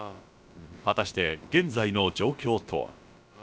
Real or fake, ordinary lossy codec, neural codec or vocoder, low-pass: fake; none; codec, 16 kHz, about 1 kbps, DyCAST, with the encoder's durations; none